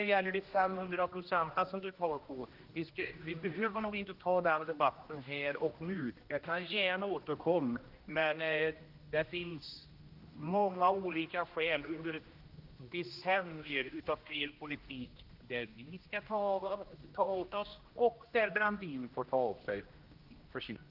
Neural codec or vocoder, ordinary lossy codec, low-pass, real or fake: codec, 16 kHz, 1 kbps, X-Codec, HuBERT features, trained on general audio; Opus, 32 kbps; 5.4 kHz; fake